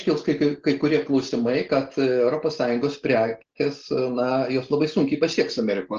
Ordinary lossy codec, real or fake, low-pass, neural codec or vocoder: Opus, 16 kbps; real; 7.2 kHz; none